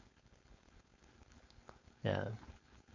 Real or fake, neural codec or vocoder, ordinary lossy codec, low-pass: fake; codec, 16 kHz, 4.8 kbps, FACodec; MP3, 48 kbps; 7.2 kHz